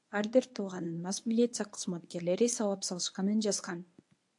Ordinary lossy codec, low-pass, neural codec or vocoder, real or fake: AAC, 64 kbps; 10.8 kHz; codec, 24 kHz, 0.9 kbps, WavTokenizer, medium speech release version 1; fake